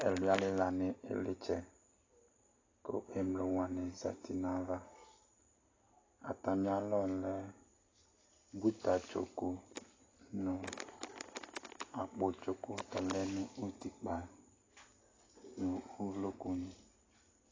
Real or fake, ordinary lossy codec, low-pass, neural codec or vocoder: real; AAC, 32 kbps; 7.2 kHz; none